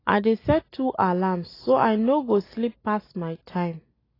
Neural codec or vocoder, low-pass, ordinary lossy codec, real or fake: none; 5.4 kHz; AAC, 24 kbps; real